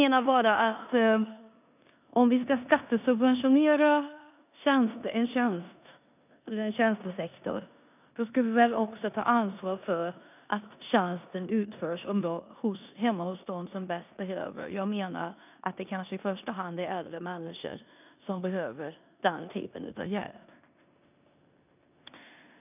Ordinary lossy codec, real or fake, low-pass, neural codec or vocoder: none; fake; 3.6 kHz; codec, 16 kHz in and 24 kHz out, 0.9 kbps, LongCat-Audio-Codec, four codebook decoder